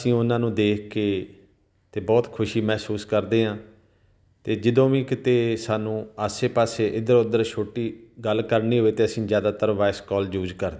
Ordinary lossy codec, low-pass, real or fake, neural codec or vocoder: none; none; real; none